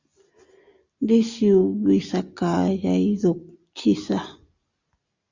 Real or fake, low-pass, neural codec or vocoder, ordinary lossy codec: real; 7.2 kHz; none; Opus, 64 kbps